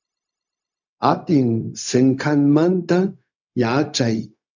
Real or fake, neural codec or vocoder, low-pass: fake; codec, 16 kHz, 0.4 kbps, LongCat-Audio-Codec; 7.2 kHz